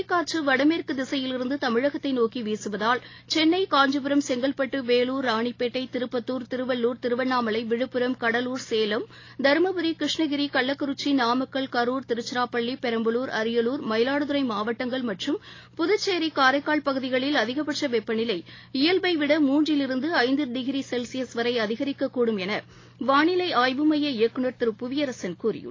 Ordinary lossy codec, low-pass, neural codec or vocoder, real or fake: AAC, 32 kbps; 7.2 kHz; none; real